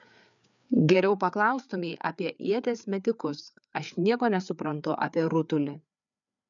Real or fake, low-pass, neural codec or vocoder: fake; 7.2 kHz; codec, 16 kHz, 4 kbps, FreqCodec, larger model